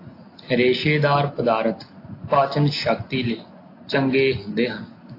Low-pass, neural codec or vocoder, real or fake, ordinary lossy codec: 5.4 kHz; none; real; AAC, 32 kbps